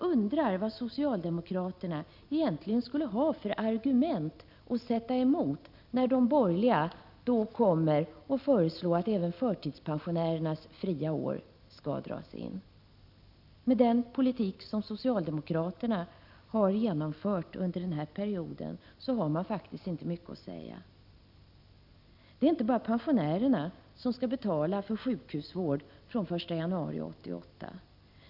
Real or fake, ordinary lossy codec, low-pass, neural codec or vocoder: real; none; 5.4 kHz; none